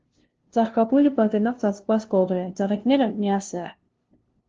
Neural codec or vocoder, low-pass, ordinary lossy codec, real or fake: codec, 16 kHz, 0.5 kbps, FunCodec, trained on LibriTTS, 25 frames a second; 7.2 kHz; Opus, 16 kbps; fake